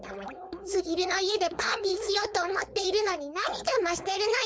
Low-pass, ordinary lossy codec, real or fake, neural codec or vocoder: none; none; fake; codec, 16 kHz, 4.8 kbps, FACodec